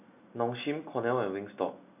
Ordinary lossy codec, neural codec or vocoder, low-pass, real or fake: none; none; 3.6 kHz; real